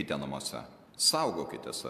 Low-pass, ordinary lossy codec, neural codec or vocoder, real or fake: 14.4 kHz; MP3, 96 kbps; none; real